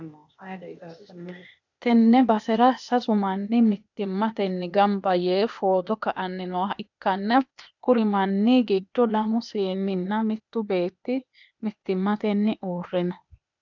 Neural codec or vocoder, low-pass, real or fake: codec, 16 kHz, 0.8 kbps, ZipCodec; 7.2 kHz; fake